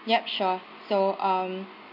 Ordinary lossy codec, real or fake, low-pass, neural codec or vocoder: none; real; 5.4 kHz; none